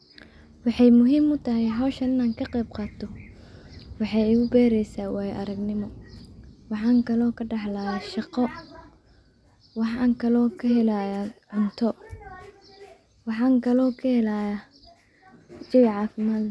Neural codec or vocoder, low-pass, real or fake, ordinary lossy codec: none; none; real; none